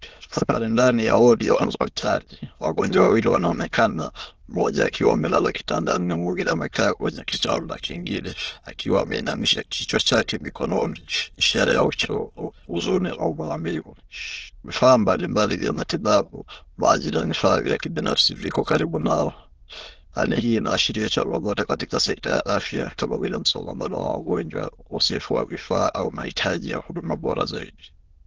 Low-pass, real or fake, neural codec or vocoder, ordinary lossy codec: 7.2 kHz; fake; autoencoder, 22.05 kHz, a latent of 192 numbers a frame, VITS, trained on many speakers; Opus, 16 kbps